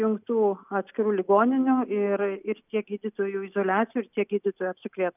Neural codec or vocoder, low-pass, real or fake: none; 3.6 kHz; real